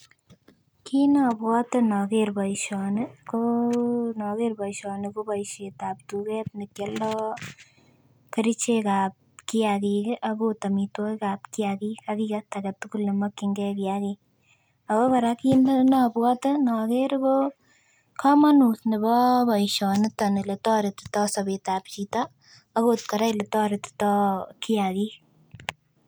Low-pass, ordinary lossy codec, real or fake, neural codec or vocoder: none; none; real; none